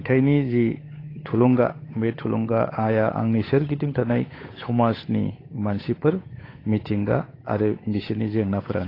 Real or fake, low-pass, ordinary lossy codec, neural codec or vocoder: fake; 5.4 kHz; AAC, 24 kbps; codec, 16 kHz, 8 kbps, FunCodec, trained on Chinese and English, 25 frames a second